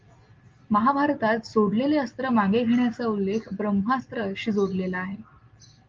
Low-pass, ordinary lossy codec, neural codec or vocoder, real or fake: 7.2 kHz; Opus, 32 kbps; none; real